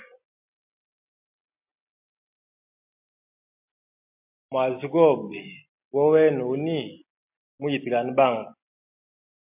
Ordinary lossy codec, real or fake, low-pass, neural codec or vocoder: MP3, 32 kbps; real; 3.6 kHz; none